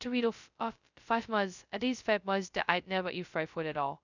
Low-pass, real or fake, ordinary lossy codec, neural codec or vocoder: 7.2 kHz; fake; none; codec, 16 kHz, 0.2 kbps, FocalCodec